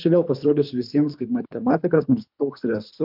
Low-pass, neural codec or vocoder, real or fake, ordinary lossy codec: 5.4 kHz; codec, 24 kHz, 3 kbps, HILCodec; fake; MP3, 48 kbps